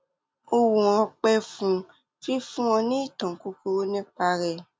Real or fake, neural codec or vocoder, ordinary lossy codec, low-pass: real; none; none; none